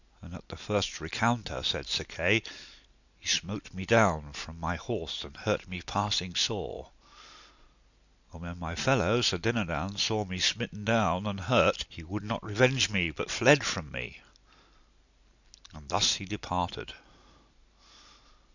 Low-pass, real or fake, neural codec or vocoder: 7.2 kHz; real; none